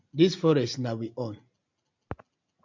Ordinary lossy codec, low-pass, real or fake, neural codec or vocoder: MP3, 64 kbps; 7.2 kHz; real; none